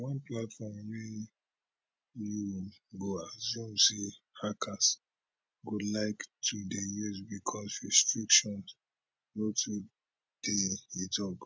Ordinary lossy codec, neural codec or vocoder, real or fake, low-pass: none; none; real; none